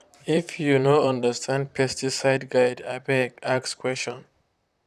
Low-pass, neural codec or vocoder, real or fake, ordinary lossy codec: 14.4 kHz; vocoder, 44.1 kHz, 128 mel bands, Pupu-Vocoder; fake; none